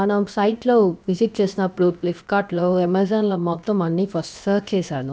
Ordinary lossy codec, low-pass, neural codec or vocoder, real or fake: none; none; codec, 16 kHz, about 1 kbps, DyCAST, with the encoder's durations; fake